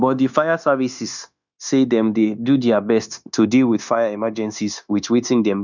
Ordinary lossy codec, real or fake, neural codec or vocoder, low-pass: none; fake; codec, 16 kHz, 0.9 kbps, LongCat-Audio-Codec; 7.2 kHz